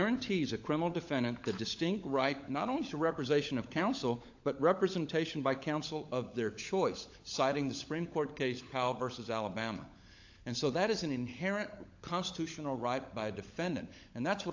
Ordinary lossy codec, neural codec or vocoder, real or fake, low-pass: AAC, 48 kbps; codec, 16 kHz, 16 kbps, FunCodec, trained on LibriTTS, 50 frames a second; fake; 7.2 kHz